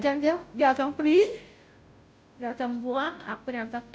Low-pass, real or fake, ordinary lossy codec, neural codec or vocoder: none; fake; none; codec, 16 kHz, 0.5 kbps, FunCodec, trained on Chinese and English, 25 frames a second